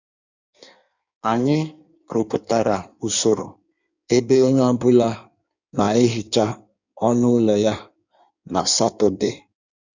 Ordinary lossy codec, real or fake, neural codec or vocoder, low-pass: none; fake; codec, 16 kHz in and 24 kHz out, 1.1 kbps, FireRedTTS-2 codec; 7.2 kHz